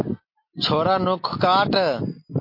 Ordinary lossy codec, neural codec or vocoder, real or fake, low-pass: MP3, 32 kbps; none; real; 5.4 kHz